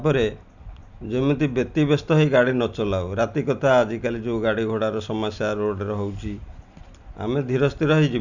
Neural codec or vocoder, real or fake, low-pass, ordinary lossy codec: none; real; 7.2 kHz; none